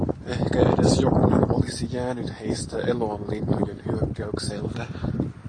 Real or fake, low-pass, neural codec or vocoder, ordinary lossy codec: real; 9.9 kHz; none; AAC, 32 kbps